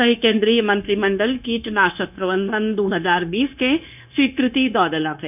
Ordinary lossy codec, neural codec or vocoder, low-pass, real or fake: none; codec, 16 kHz, 0.9 kbps, LongCat-Audio-Codec; 3.6 kHz; fake